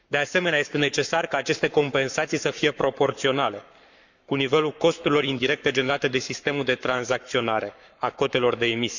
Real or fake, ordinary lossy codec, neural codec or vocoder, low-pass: fake; none; codec, 44.1 kHz, 7.8 kbps, Pupu-Codec; 7.2 kHz